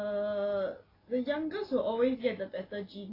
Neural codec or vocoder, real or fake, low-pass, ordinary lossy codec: none; real; 5.4 kHz; AAC, 24 kbps